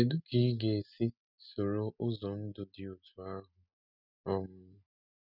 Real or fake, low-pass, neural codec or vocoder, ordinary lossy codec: real; 5.4 kHz; none; none